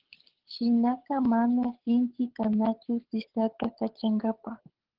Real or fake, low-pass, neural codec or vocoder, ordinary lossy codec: fake; 5.4 kHz; codec, 24 kHz, 6 kbps, HILCodec; Opus, 16 kbps